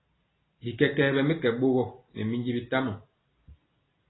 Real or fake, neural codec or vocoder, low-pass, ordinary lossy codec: real; none; 7.2 kHz; AAC, 16 kbps